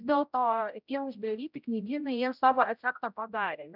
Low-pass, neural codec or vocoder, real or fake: 5.4 kHz; codec, 16 kHz, 0.5 kbps, X-Codec, HuBERT features, trained on general audio; fake